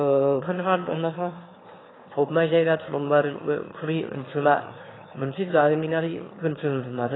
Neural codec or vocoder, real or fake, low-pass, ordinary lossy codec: autoencoder, 22.05 kHz, a latent of 192 numbers a frame, VITS, trained on one speaker; fake; 7.2 kHz; AAC, 16 kbps